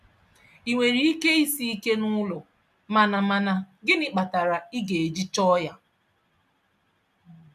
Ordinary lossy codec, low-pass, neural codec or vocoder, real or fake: none; 14.4 kHz; none; real